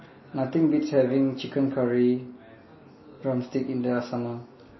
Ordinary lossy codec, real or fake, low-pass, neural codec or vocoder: MP3, 24 kbps; real; 7.2 kHz; none